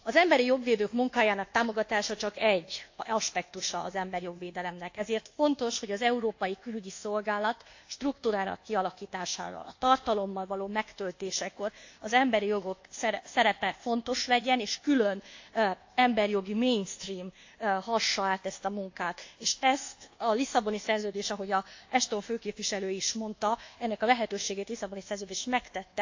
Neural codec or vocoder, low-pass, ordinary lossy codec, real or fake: codec, 24 kHz, 1.2 kbps, DualCodec; 7.2 kHz; AAC, 48 kbps; fake